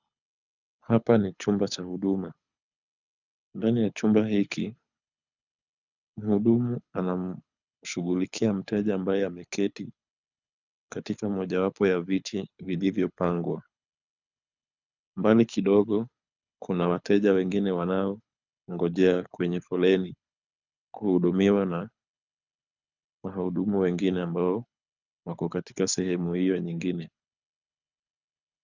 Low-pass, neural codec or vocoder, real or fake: 7.2 kHz; codec, 24 kHz, 6 kbps, HILCodec; fake